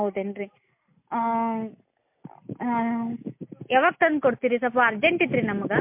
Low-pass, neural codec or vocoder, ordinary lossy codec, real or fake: 3.6 kHz; none; MP3, 32 kbps; real